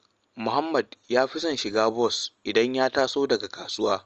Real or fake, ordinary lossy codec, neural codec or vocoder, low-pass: real; Opus, 24 kbps; none; 7.2 kHz